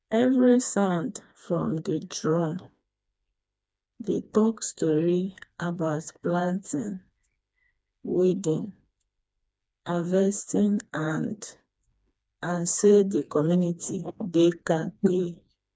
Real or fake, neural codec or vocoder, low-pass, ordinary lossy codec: fake; codec, 16 kHz, 2 kbps, FreqCodec, smaller model; none; none